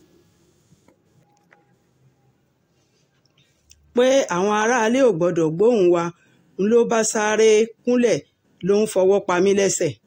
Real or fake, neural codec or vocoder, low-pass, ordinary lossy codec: real; none; 19.8 kHz; AAC, 48 kbps